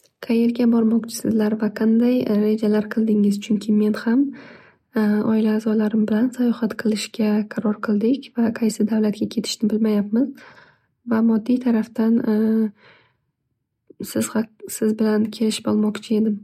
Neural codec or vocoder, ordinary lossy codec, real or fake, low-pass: none; MP3, 64 kbps; real; 19.8 kHz